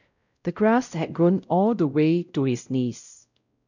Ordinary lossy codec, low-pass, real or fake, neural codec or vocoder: none; 7.2 kHz; fake; codec, 16 kHz, 0.5 kbps, X-Codec, WavLM features, trained on Multilingual LibriSpeech